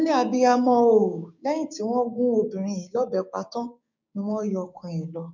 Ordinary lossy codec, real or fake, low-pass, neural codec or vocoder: none; fake; 7.2 kHz; codec, 16 kHz, 6 kbps, DAC